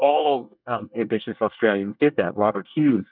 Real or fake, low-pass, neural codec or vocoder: fake; 5.4 kHz; codec, 24 kHz, 1 kbps, SNAC